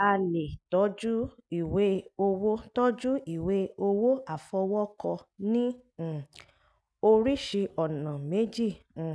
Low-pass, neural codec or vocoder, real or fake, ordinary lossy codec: none; none; real; none